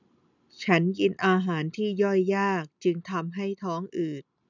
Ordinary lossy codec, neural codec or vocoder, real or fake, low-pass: none; none; real; 7.2 kHz